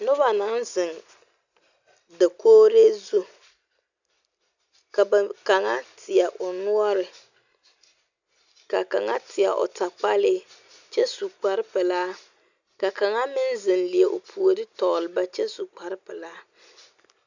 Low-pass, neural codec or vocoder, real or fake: 7.2 kHz; none; real